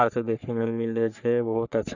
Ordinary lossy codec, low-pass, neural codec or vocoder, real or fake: none; 7.2 kHz; codec, 44.1 kHz, 3.4 kbps, Pupu-Codec; fake